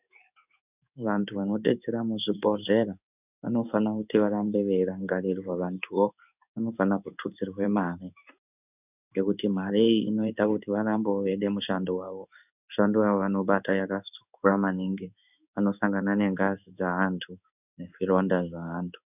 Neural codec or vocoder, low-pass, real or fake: codec, 16 kHz in and 24 kHz out, 1 kbps, XY-Tokenizer; 3.6 kHz; fake